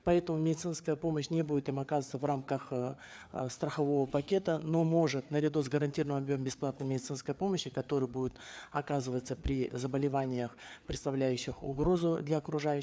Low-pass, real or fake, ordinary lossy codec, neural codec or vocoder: none; fake; none; codec, 16 kHz, 4 kbps, FreqCodec, larger model